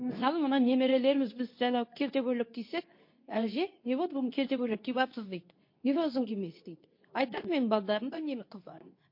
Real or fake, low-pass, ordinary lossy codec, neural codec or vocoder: fake; 5.4 kHz; MP3, 32 kbps; codec, 24 kHz, 0.9 kbps, WavTokenizer, medium speech release version 2